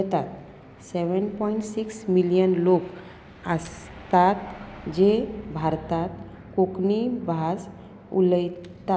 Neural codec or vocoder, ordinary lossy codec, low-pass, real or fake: none; none; none; real